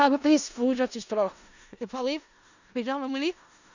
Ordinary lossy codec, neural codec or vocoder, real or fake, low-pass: none; codec, 16 kHz in and 24 kHz out, 0.4 kbps, LongCat-Audio-Codec, four codebook decoder; fake; 7.2 kHz